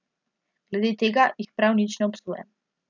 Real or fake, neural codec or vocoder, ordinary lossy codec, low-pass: real; none; none; 7.2 kHz